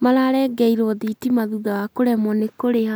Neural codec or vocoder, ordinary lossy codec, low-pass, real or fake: none; none; none; real